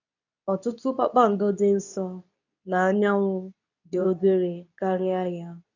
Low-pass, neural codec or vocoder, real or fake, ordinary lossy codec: 7.2 kHz; codec, 24 kHz, 0.9 kbps, WavTokenizer, medium speech release version 2; fake; none